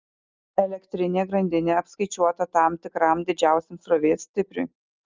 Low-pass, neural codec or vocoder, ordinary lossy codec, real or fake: 7.2 kHz; none; Opus, 24 kbps; real